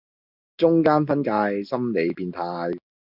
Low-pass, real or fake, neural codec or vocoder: 5.4 kHz; real; none